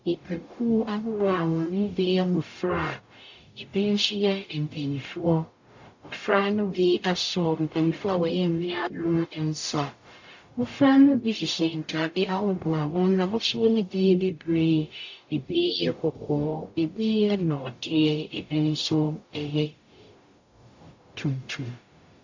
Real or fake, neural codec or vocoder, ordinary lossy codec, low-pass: fake; codec, 44.1 kHz, 0.9 kbps, DAC; AAC, 48 kbps; 7.2 kHz